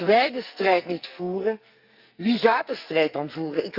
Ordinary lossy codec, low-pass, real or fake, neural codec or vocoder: Opus, 64 kbps; 5.4 kHz; fake; codec, 44.1 kHz, 2.6 kbps, SNAC